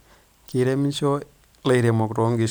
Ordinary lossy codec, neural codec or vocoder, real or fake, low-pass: none; vocoder, 44.1 kHz, 128 mel bands every 512 samples, BigVGAN v2; fake; none